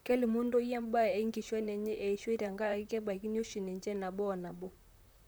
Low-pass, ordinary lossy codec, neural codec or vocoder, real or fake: none; none; vocoder, 44.1 kHz, 128 mel bands, Pupu-Vocoder; fake